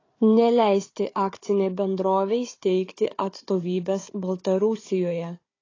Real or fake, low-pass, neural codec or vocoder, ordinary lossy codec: fake; 7.2 kHz; codec, 16 kHz, 4 kbps, FunCodec, trained on Chinese and English, 50 frames a second; AAC, 32 kbps